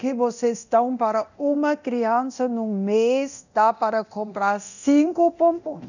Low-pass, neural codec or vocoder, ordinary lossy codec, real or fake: 7.2 kHz; codec, 24 kHz, 0.9 kbps, DualCodec; none; fake